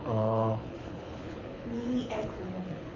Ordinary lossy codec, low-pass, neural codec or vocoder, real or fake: MP3, 48 kbps; 7.2 kHz; codec, 24 kHz, 6 kbps, HILCodec; fake